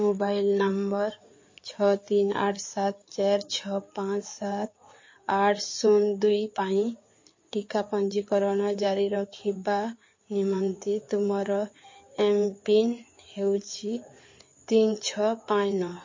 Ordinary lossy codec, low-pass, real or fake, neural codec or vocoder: MP3, 32 kbps; 7.2 kHz; fake; codec, 16 kHz in and 24 kHz out, 2.2 kbps, FireRedTTS-2 codec